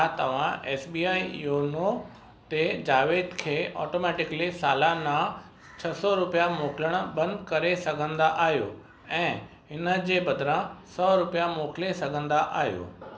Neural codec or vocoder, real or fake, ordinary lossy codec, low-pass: none; real; none; none